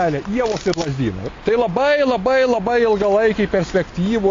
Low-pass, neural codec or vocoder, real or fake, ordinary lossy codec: 7.2 kHz; none; real; AAC, 32 kbps